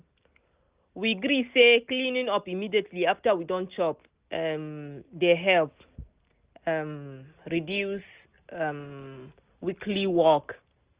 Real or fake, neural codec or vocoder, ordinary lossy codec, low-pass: real; none; Opus, 32 kbps; 3.6 kHz